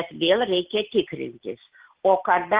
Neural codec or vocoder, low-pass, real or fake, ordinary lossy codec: none; 3.6 kHz; real; Opus, 16 kbps